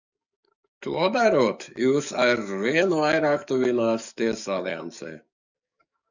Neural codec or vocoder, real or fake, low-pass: codec, 44.1 kHz, 7.8 kbps, DAC; fake; 7.2 kHz